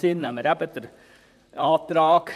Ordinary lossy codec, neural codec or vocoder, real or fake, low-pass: none; vocoder, 44.1 kHz, 128 mel bands, Pupu-Vocoder; fake; 14.4 kHz